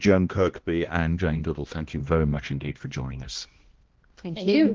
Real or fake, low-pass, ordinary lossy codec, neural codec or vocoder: fake; 7.2 kHz; Opus, 16 kbps; codec, 16 kHz, 1 kbps, X-Codec, HuBERT features, trained on balanced general audio